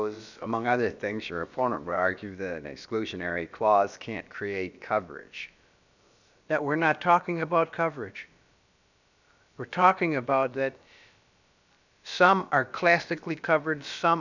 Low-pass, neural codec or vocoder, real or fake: 7.2 kHz; codec, 16 kHz, about 1 kbps, DyCAST, with the encoder's durations; fake